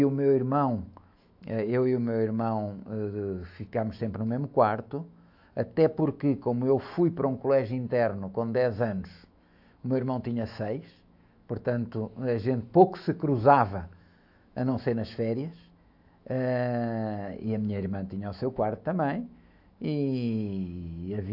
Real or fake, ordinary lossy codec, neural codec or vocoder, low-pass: fake; AAC, 48 kbps; autoencoder, 48 kHz, 128 numbers a frame, DAC-VAE, trained on Japanese speech; 5.4 kHz